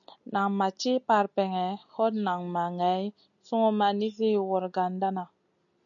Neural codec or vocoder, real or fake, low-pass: none; real; 7.2 kHz